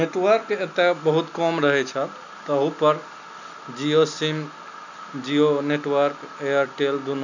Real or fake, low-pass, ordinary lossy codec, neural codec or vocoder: real; 7.2 kHz; none; none